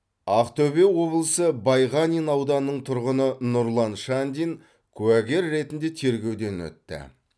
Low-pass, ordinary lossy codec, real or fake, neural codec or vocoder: none; none; real; none